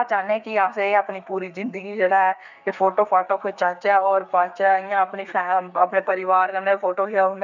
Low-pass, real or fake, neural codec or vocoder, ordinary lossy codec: 7.2 kHz; fake; codec, 32 kHz, 1.9 kbps, SNAC; none